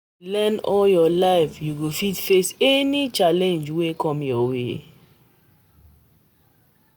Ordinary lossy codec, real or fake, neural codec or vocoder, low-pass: none; real; none; none